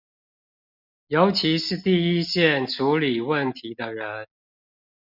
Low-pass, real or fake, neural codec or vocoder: 5.4 kHz; real; none